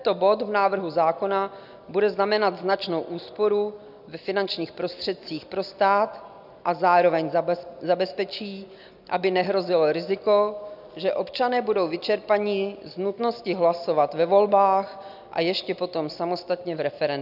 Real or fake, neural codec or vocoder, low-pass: real; none; 5.4 kHz